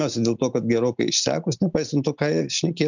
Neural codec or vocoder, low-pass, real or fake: none; 7.2 kHz; real